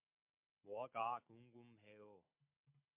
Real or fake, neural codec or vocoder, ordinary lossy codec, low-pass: real; none; MP3, 32 kbps; 3.6 kHz